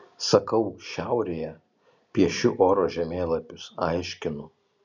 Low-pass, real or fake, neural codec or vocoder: 7.2 kHz; real; none